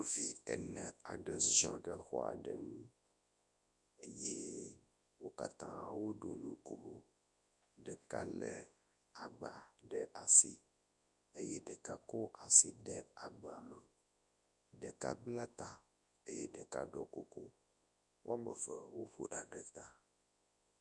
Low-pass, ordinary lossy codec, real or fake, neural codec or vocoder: 10.8 kHz; Opus, 64 kbps; fake; codec, 24 kHz, 0.9 kbps, WavTokenizer, large speech release